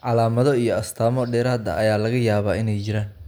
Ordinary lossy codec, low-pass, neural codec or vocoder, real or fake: none; none; none; real